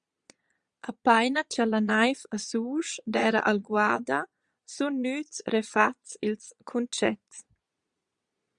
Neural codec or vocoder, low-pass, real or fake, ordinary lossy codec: vocoder, 22.05 kHz, 80 mel bands, Vocos; 9.9 kHz; fake; Opus, 64 kbps